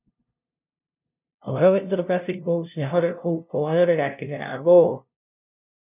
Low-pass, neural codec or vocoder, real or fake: 3.6 kHz; codec, 16 kHz, 0.5 kbps, FunCodec, trained on LibriTTS, 25 frames a second; fake